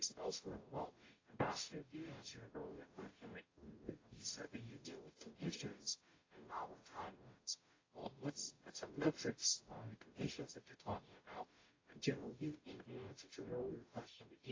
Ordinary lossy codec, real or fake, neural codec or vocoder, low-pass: AAC, 32 kbps; fake; codec, 44.1 kHz, 0.9 kbps, DAC; 7.2 kHz